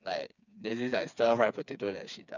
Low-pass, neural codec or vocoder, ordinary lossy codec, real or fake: 7.2 kHz; codec, 16 kHz, 4 kbps, FreqCodec, smaller model; none; fake